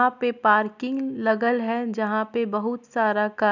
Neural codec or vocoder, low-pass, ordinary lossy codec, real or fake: none; 7.2 kHz; none; real